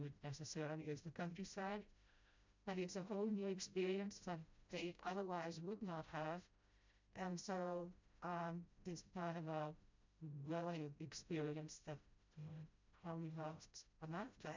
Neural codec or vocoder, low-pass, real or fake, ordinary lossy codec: codec, 16 kHz, 0.5 kbps, FreqCodec, smaller model; 7.2 kHz; fake; MP3, 64 kbps